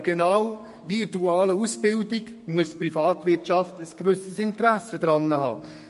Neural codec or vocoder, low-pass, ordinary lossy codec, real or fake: codec, 32 kHz, 1.9 kbps, SNAC; 14.4 kHz; MP3, 48 kbps; fake